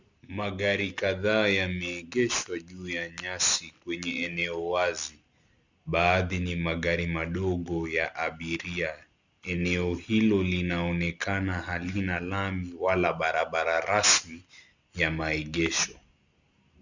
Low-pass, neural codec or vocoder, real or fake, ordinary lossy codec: 7.2 kHz; none; real; Opus, 64 kbps